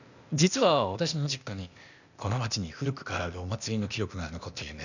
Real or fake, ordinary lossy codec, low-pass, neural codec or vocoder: fake; none; 7.2 kHz; codec, 16 kHz, 0.8 kbps, ZipCodec